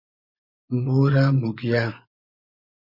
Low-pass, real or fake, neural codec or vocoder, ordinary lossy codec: 5.4 kHz; fake; vocoder, 24 kHz, 100 mel bands, Vocos; Opus, 64 kbps